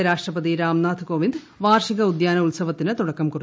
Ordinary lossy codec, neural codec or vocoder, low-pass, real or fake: none; none; none; real